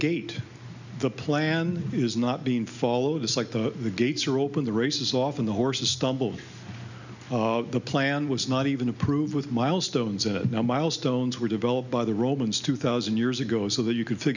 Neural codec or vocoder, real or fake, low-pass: none; real; 7.2 kHz